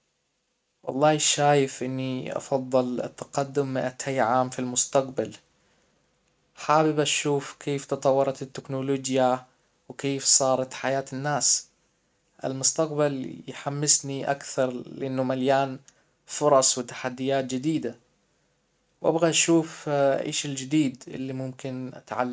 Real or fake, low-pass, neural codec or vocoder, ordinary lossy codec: real; none; none; none